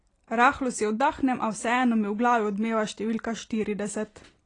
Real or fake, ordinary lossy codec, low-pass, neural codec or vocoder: real; AAC, 32 kbps; 9.9 kHz; none